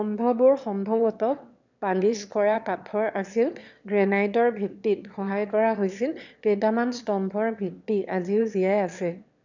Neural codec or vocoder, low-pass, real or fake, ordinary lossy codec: autoencoder, 22.05 kHz, a latent of 192 numbers a frame, VITS, trained on one speaker; 7.2 kHz; fake; none